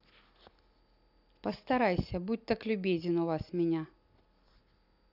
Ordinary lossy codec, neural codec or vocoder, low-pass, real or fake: none; none; 5.4 kHz; real